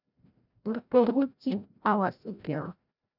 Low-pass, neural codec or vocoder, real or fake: 5.4 kHz; codec, 16 kHz, 0.5 kbps, FreqCodec, larger model; fake